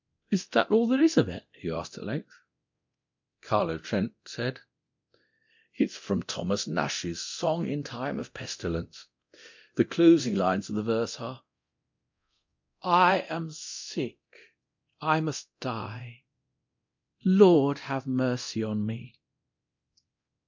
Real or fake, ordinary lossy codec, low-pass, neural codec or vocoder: fake; MP3, 64 kbps; 7.2 kHz; codec, 24 kHz, 0.9 kbps, DualCodec